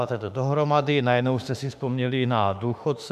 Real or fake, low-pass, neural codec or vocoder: fake; 14.4 kHz; autoencoder, 48 kHz, 32 numbers a frame, DAC-VAE, trained on Japanese speech